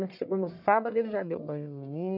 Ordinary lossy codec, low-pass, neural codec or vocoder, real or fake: none; 5.4 kHz; codec, 44.1 kHz, 1.7 kbps, Pupu-Codec; fake